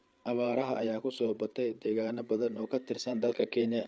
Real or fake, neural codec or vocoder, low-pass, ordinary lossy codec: fake; codec, 16 kHz, 8 kbps, FreqCodec, larger model; none; none